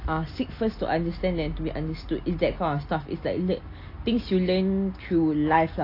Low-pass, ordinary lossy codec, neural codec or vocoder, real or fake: 5.4 kHz; AAC, 32 kbps; none; real